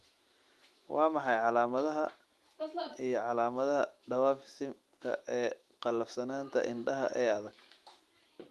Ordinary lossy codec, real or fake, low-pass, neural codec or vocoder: Opus, 24 kbps; fake; 14.4 kHz; autoencoder, 48 kHz, 128 numbers a frame, DAC-VAE, trained on Japanese speech